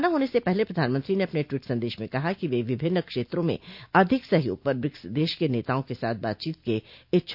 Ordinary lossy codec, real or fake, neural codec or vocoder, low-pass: none; real; none; 5.4 kHz